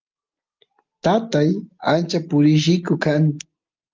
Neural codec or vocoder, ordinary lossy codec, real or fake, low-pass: none; Opus, 24 kbps; real; 7.2 kHz